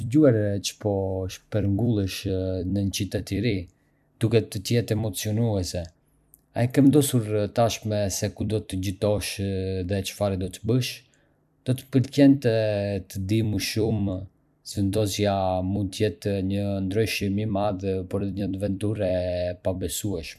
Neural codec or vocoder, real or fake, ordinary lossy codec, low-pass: vocoder, 44.1 kHz, 128 mel bands every 256 samples, BigVGAN v2; fake; none; 14.4 kHz